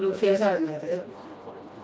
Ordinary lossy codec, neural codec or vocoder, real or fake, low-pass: none; codec, 16 kHz, 1 kbps, FreqCodec, smaller model; fake; none